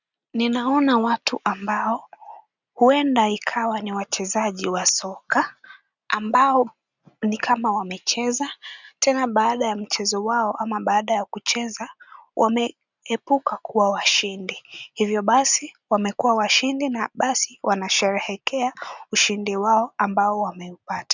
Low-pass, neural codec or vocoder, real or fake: 7.2 kHz; none; real